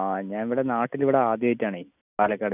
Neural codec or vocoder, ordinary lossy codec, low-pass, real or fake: none; none; 3.6 kHz; real